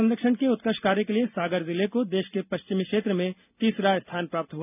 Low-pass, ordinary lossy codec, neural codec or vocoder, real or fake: 3.6 kHz; none; none; real